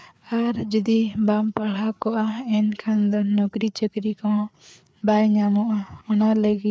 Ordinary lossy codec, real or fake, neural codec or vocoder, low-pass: none; fake; codec, 16 kHz, 4 kbps, FreqCodec, larger model; none